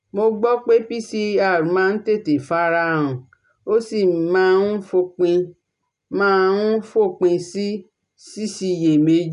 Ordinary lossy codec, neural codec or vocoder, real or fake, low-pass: none; none; real; 10.8 kHz